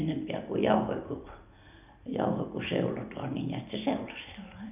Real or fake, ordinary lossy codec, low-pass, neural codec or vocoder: real; none; 3.6 kHz; none